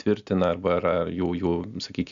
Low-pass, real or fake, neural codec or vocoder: 7.2 kHz; real; none